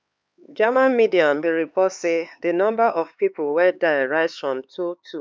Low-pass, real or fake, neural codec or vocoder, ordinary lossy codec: none; fake; codec, 16 kHz, 4 kbps, X-Codec, HuBERT features, trained on LibriSpeech; none